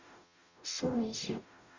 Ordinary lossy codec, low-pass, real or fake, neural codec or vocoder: Opus, 64 kbps; 7.2 kHz; fake; codec, 44.1 kHz, 0.9 kbps, DAC